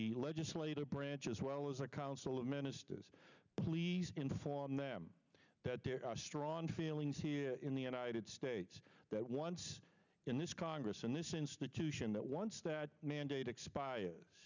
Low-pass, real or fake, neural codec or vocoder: 7.2 kHz; real; none